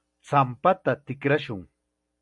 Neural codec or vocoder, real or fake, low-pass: none; real; 10.8 kHz